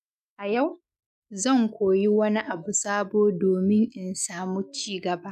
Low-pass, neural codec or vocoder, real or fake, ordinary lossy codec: 14.4 kHz; autoencoder, 48 kHz, 128 numbers a frame, DAC-VAE, trained on Japanese speech; fake; none